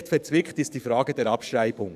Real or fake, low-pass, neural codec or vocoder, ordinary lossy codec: fake; 14.4 kHz; codec, 44.1 kHz, 7.8 kbps, DAC; none